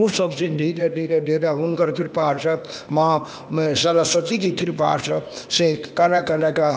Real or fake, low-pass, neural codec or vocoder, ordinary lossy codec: fake; none; codec, 16 kHz, 0.8 kbps, ZipCodec; none